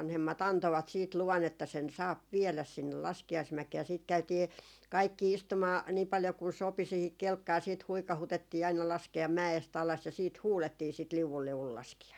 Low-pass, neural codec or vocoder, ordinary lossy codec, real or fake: 19.8 kHz; none; none; real